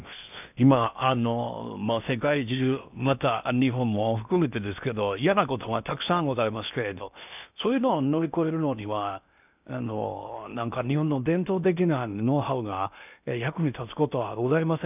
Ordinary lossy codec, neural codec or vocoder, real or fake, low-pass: none; codec, 16 kHz in and 24 kHz out, 0.8 kbps, FocalCodec, streaming, 65536 codes; fake; 3.6 kHz